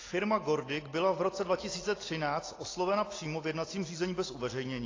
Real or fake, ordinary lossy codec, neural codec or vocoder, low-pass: real; AAC, 32 kbps; none; 7.2 kHz